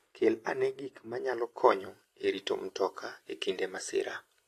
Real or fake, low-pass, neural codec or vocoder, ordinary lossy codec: real; 14.4 kHz; none; AAC, 48 kbps